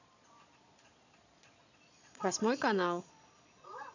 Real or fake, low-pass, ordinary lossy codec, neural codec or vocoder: fake; 7.2 kHz; none; vocoder, 22.05 kHz, 80 mel bands, Vocos